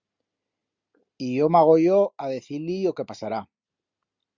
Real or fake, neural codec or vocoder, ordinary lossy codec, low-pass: real; none; Opus, 64 kbps; 7.2 kHz